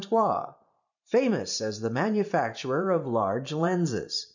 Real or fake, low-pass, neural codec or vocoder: fake; 7.2 kHz; vocoder, 44.1 kHz, 128 mel bands every 512 samples, BigVGAN v2